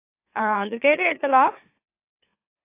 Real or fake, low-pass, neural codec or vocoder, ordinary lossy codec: fake; 3.6 kHz; autoencoder, 44.1 kHz, a latent of 192 numbers a frame, MeloTTS; AAC, 24 kbps